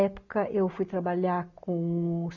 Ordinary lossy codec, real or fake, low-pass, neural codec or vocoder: none; real; 7.2 kHz; none